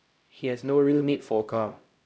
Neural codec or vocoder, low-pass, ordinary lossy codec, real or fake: codec, 16 kHz, 0.5 kbps, X-Codec, HuBERT features, trained on LibriSpeech; none; none; fake